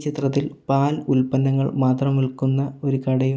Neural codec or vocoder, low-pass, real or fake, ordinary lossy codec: none; none; real; none